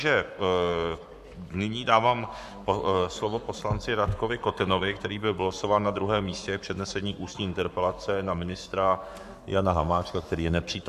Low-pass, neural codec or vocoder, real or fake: 14.4 kHz; codec, 44.1 kHz, 7.8 kbps, Pupu-Codec; fake